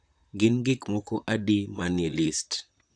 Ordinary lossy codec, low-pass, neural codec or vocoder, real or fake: none; 9.9 kHz; vocoder, 44.1 kHz, 128 mel bands, Pupu-Vocoder; fake